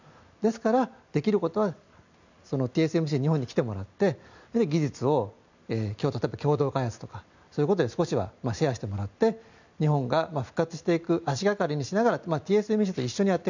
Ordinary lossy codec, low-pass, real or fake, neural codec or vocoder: none; 7.2 kHz; real; none